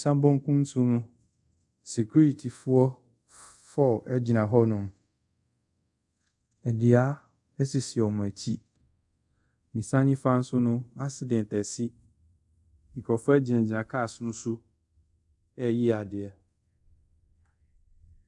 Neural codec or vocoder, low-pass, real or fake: codec, 24 kHz, 0.5 kbps, DualCodec; 10.8 kHz; fake